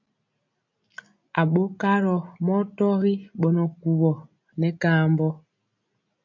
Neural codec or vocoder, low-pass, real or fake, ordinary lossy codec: none; 7.2 kHz; real; AAC, 48 kbps